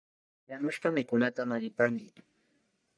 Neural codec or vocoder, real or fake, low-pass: codec, 44.1 kHz, 1.7 kbps, Pupu-Codec; fake; 9.9 kHz